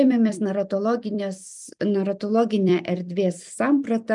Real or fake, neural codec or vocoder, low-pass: fake; vocoder, 44.1 kHz, 128 mel bands every 256 samples, BigVGAN v2; 10.8 kHz